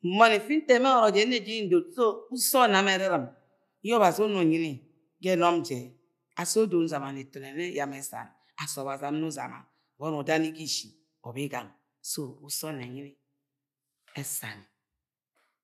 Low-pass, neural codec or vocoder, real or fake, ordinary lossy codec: 14.4 kHz; none; real; none